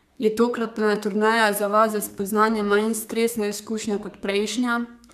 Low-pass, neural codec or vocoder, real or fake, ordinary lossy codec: 14.4 kHz; codec, 32 kHz, 1.9 kbps, SNAC; fake; none